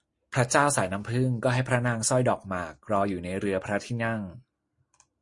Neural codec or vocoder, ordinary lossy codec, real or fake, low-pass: none; MP3, 48 kbps; real; 10.8 kHz